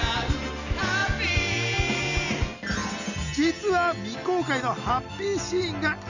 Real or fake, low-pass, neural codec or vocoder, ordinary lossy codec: real; 7.2 kHz; none; none